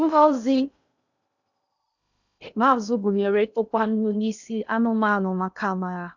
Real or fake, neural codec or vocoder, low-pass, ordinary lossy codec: fake; codec, 16 kHz in and 24 kHz out, 0.6 kbps, FocalCodec, streaming, 2048 codes; 7.2 kHz; none